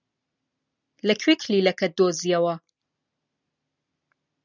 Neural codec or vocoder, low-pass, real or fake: none; 7.2 kHz; real